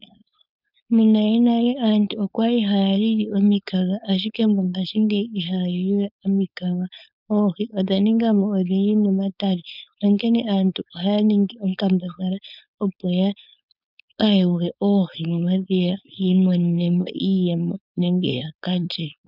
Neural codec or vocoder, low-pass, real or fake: codec, 16 kHz, 4.8 kbps, FACodec; 5.4 kHz; fake